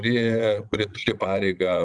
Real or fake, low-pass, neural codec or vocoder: fake; 9.9 kHz; vocoder, 22.05 kHz, 80 mel bands, Vocos